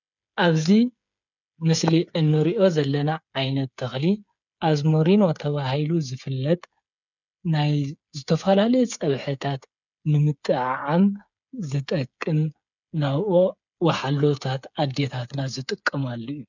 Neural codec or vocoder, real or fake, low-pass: codec, 16 kHz, 8 kbps, FreqCodec, smaller model; fake; 7.2 kHz